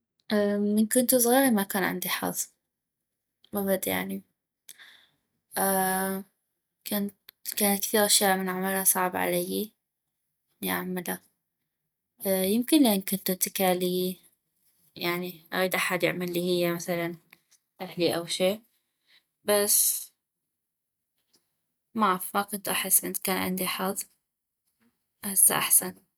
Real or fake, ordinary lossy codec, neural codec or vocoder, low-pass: real; none; none; none